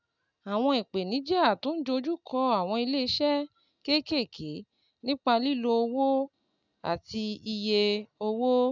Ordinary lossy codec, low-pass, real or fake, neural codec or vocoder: none; 7.2 kHz; real; none